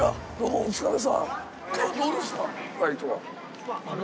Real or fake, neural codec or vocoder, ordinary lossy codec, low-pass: real; none; none; none